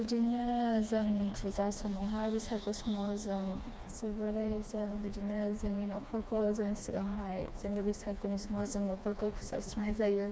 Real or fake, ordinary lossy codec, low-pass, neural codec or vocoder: fake; none; none; codec, 16 kHz, 2 kbps, FreqCodec, smaller model